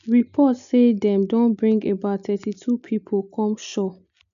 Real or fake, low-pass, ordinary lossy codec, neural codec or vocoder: real; 7.2 kHz; none; none